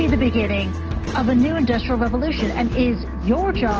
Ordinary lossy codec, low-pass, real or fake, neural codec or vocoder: Opus, 16 kbps; 7.2 kHz; real; none